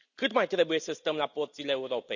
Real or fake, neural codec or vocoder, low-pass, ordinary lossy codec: real; none; 7.2 kHz; none